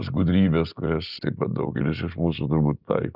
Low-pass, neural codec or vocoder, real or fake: 5.4 kHz; none; real